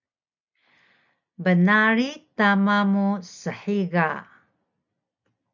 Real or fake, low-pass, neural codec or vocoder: real; 7.2 kHz; none